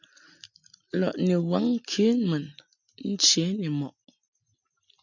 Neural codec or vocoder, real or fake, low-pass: none; real; 7.2 kHz